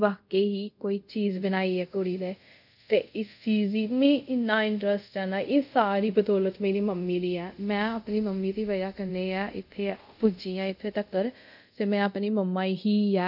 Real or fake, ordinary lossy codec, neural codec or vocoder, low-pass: fake; none; codec, 24 kHz, 0.5 kbps, DualCodec; 5.4 kHz